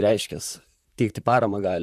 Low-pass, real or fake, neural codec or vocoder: 14.4 kHz; fake; vocoder, 44.1 kHz, 128 mel bands, Pupu-Vocoder